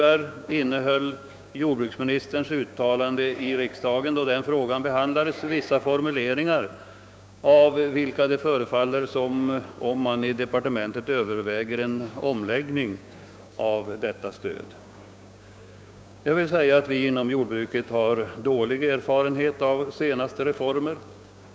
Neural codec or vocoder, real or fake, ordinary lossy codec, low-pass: codec, 16 kHz, 6 kbps, DAC; fake; none; none